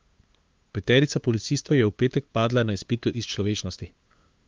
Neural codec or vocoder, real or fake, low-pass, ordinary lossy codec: codec, 16 kHz, 2 kbps, FunCodec, trained on LibriTTS, 25 frames a second; fake; 7.2 kHz; Opus, 32 kbps